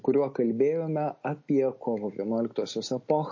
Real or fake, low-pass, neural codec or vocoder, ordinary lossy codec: real; 7.2 kHz; none; MP3, 32 kbps